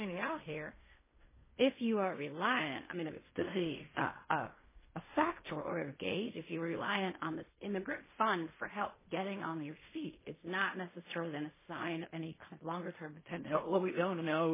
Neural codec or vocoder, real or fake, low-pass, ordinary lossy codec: codec, 16 kHz in and 24 kHz out, 0.4 kbps, LongCat-Audio-Codec, fine tuned four codebook decoder; fake; 3.6 kHz; MP3, 16 kbps